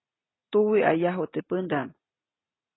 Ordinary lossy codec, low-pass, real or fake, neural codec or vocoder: AAC, 16 kbps; 7.2 kHz; real; none